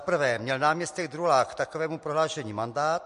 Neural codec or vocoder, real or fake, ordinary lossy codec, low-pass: none; real; MP3, 48 kbps; 14.4 kHz